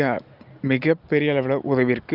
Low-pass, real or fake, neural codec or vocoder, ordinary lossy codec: 5.4 kHz; real; none; Opus, 24 kbps